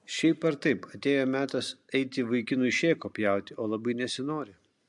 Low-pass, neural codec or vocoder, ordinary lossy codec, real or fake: 10.8 kHz; none; MP3, 64 kbps; real